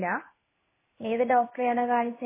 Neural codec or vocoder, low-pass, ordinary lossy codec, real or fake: codec, 16 kHz in and 24 kHz out, 1 kbps, XY-Tokenizer; 3.6 kHz; MP3, 16 kbps; fake